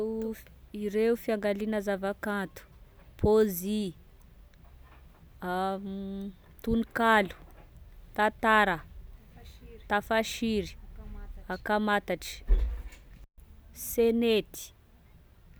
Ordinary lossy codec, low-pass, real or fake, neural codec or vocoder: none; none; real; none